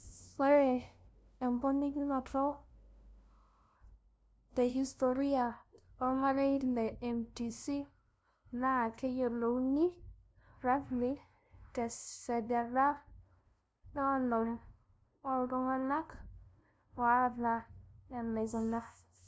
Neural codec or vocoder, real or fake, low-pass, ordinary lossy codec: codec, 16 kHz, 0.5 kbps, FunCodec, trained on LibriTTS, 25 frames a second; fake; none; none